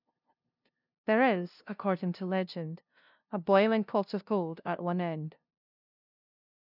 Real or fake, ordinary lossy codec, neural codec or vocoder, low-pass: fake; none; codec, 16 kHz, 0.5 kbps, FunCodec, trained on LibriTTS, 25 frames a second; 5.4 kHz